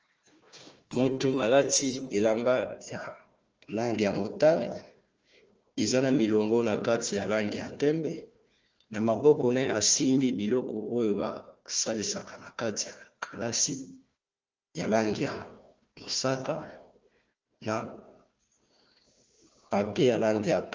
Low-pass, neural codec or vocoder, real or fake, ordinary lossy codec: 7.2 kHz; codec, 16 kHz, 1 kbps, FunCodec, trained on Chinese and English, 50 frames a second; fake; Opus, 24 kbps